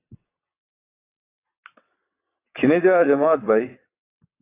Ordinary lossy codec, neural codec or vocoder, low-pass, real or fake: AAC, 24 kbps; vocoder, 22.05 kHz, 80 mel bands, WaveNeXt; 3.6 kHz; fake